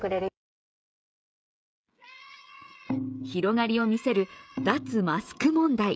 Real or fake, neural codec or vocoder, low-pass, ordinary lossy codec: fake; codec, 16 kHz, 16 kbps, FreqCodec, smaller model; none; none